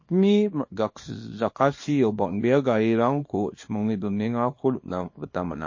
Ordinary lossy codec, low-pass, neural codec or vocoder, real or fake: MP3, 32 kbps; 7.2 kHz; codec, 24 kHz, 0.9 kbps, WavTokenizer, small release; fake